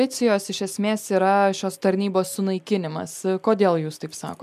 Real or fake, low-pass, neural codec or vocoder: real; 14.4 kHz; none